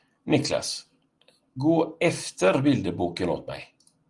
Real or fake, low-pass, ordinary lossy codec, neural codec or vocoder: real; 10.8 kHz; Opus, 24 kbps; none